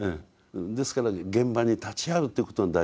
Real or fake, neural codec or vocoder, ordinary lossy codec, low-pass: real; none; none; none